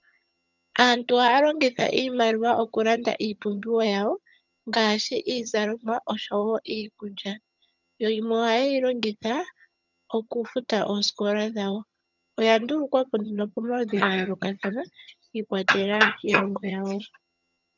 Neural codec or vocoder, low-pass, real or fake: vocoder, 22.05 kHz, 80 mel bands, HiFi-GAN; 7.2 kHz; fake